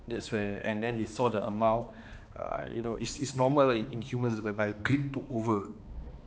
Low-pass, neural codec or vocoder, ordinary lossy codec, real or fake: none; codec, 16 kHz, 2 kbps, X-Codec, HuBERT features, trained on balanced general audio; none; fake